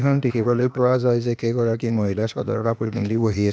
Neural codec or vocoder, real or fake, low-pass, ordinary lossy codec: codec, 16 kHz, 0.8 kbps, ZipCodec; fake; none; none